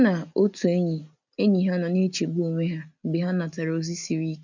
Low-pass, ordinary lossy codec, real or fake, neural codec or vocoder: 7.2 kHz; none; real; none